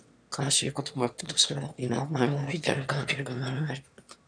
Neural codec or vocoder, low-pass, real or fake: autoencoder, 22.05 kHz, a latent of 192 numbers a frame, VITS, trained on one speaker; 9.9 kHz; fake